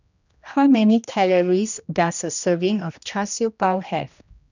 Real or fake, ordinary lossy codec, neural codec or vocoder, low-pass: fake; none; codec, 16 kHz, 1 kbps, X-Codec, HuBERT features, trained on general audio; 7.2 kHz